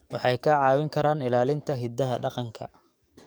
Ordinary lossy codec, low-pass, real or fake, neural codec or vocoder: none; none; fake; codec, 44.1 kHz, 7.8 kbps, DAC